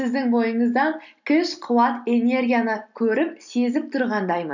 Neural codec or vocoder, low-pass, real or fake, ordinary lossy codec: none; 7.2 kHz; real; none